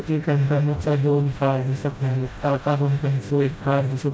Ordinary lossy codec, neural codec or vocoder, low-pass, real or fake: none; codec, 16 kHz, 0.5 kbps, FreqCodec, smaller model; none; fake